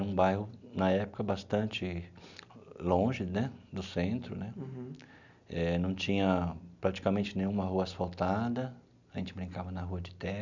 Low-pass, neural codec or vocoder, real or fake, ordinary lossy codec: 7.2 kHz; none; real; none